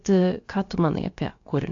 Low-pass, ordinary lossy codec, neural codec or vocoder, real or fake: 7.2 kHz; AAC, 48 kbps; codec, 16 kHz, about 1 kbps, DyCAST, with the encoder's durations; fake